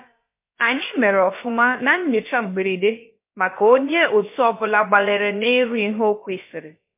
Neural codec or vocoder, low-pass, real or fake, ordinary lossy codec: codec, 16 kHz, about 1 kbps, DyCAST, with the encoder's durations; 3.6 kHz; fake; MP3, 24 kbps